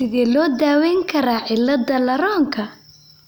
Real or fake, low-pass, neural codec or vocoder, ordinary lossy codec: real; none; none; none